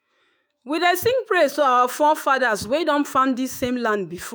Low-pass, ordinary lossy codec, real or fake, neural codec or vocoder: none; none; fake; autoencoder, 48 kHz, 128 numbers a frame, DAC-VAE, trained on Japanese speech